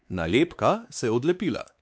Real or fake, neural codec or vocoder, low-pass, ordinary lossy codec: fake; codec, 16 kHz, 2 kbps, X-Codec, WavLM features, trained on Multilingual LibriSpeech; none; none